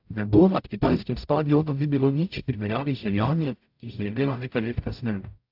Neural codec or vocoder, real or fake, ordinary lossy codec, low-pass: codec, 44.1 kHz, 0.9 kbps, DAC; fake; AAC, 48 kbps; 5.4 kHz